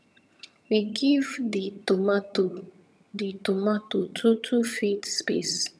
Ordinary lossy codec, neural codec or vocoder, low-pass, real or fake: none; vocoder, 22.05 kHz, 80 mel bands, HiFi-GAN; none; fake